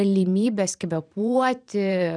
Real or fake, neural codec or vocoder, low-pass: fake; vocoder, 44.1 kHz, 128 mel bands every 512 samples, BigVGAN v2; 9.9 kHz